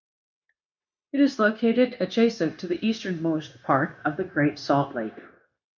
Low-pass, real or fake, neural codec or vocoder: 7.2 kHz; fake; codec, 16 kHz, 0.9 kbps, LongCat-Audio-Codec